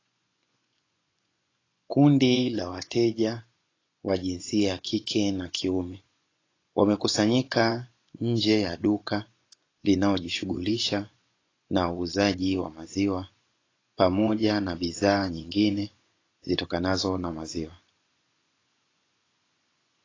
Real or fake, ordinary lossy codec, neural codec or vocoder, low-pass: fake; AAC, 32 kbps; vocoder, 24 kHz, 100 mel bands, Vocos; 7.2 kHz